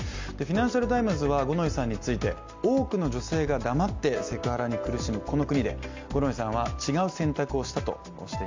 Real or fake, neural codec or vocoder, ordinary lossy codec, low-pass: real; none; MP3, 64 kbps; 7.2 kHz